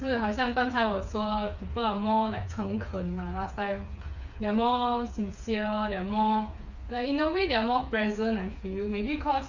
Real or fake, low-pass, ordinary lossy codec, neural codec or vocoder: fake; 7.2 kHz; none; codec, 16 kHz, 4 kbps, FreqCodec, smaller model